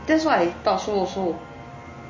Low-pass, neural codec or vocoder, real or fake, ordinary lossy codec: 7.2 kHz; none; real; MP3, 32 kbps